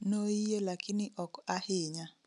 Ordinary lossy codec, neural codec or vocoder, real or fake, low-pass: none; none; real; 10.8 kHz